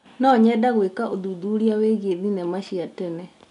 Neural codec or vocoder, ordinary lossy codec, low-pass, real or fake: none; none; 10.8 kHz; real